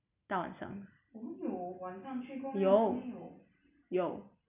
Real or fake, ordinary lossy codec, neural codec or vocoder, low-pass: real; none; none; 3.6 kHz